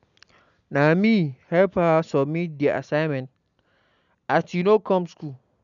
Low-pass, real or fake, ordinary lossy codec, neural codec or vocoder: 7.2 kHz; real; none; none